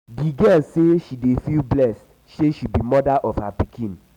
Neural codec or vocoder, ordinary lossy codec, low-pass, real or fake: none; none; 19.8 kHz; real